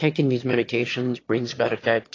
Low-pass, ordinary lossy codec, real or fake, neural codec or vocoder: 7.2 kHz; AAC, 32 kbps; fake; autoencoder, 22.05 kHz, a latent of 192 numbers a frame, VITS, trained on one speaker